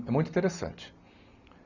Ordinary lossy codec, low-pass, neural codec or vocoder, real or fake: none; 7.2 kHz; none; real